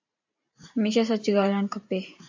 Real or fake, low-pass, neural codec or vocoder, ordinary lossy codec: fake; 7.2 kHz; vocoder, 44.1 kHz, 128 mel bands every 512 samples, BigVGAN v2; AAC, 48 kbps